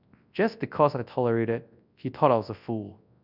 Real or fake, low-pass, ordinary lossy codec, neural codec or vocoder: fake; 5.4 kHz; none; codec, 24 kHz, 0.9 kbps, WavTokenizer, large speech release